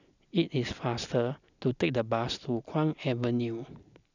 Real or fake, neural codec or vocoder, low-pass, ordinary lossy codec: real; none; 7.2 kHz; none